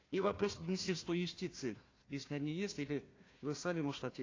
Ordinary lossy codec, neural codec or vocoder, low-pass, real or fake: AAC, 32 kbps; codec, 16 kHz, 1 kbps, FunCodec, trained on Chinese and English, 50 frames a second; 7.2 kHz; fake